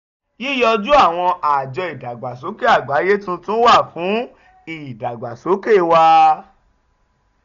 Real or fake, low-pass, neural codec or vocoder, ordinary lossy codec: real; 7.2 kHz; none; none